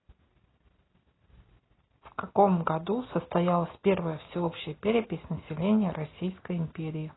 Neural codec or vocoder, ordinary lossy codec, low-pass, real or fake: none; AAC, 16 kbps; 7.2 kHz; real